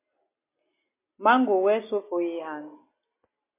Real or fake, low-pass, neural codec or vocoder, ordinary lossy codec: real; 3.6 kHz; none; MP3, 24 kbps